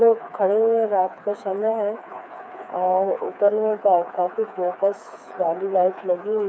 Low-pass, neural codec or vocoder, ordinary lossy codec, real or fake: none; codec, 16 kHz, 4 kbps, FreqCodec, smaller model; none; fake